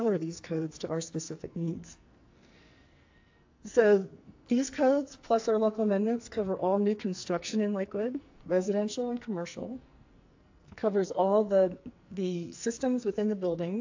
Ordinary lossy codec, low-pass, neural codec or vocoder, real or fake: AAC, 48 kbps; 7.2 kHz; codec, 44.1 kHz, 2.6 kbps, SNAC; fake